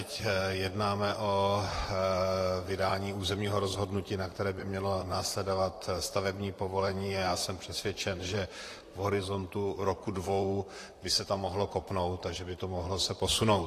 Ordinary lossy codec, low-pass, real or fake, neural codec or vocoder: AAC, 48 kbps; 14.4 kHz; fake; vocoder, 44.1 kHz, 128 mel bands, Pupu-Vocoder